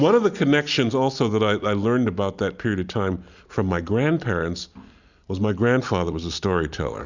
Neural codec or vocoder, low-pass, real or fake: none; 7.2 kHz; real